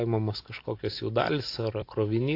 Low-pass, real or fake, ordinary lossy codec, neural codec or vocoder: 5.4 kHz; real; AAC, 32 kbps; none